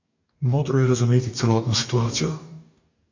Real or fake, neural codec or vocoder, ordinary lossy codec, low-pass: fake; codec, 44.1 kHz, 2.6 kbps, DAC; AAC, 48 kbps; 7.2 kHz